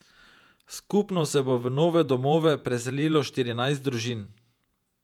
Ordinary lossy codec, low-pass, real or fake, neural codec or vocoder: none; 19.8 kHz; fake; vocoder, 48 kHz, 128 mel bands, Vocos